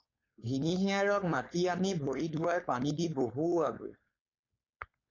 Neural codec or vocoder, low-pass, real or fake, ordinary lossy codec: codec, 16 kHz, 4.8 kbps, FACodec; 7.2 kHz; fake; AAC, 32 kbps